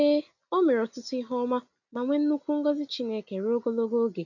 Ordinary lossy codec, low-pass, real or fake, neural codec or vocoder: none; 7.2 kHz; real; none